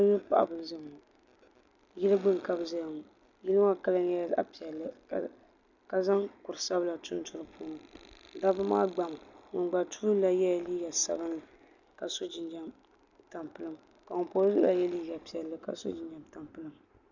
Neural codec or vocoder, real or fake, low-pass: none; real; 7.2 kHz